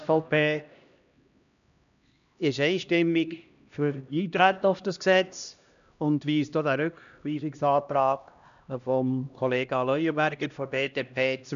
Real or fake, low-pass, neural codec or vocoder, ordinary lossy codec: fake; 7.2 kHz; codec, 16 kHz, 1 kbps, X-Codec, HuBERT features, trained on LibriSpeech; none